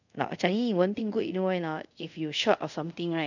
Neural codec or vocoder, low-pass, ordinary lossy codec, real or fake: codec, 24 kHz, 0.5 kbps, DualCodec; 7.2 kHz; none; fake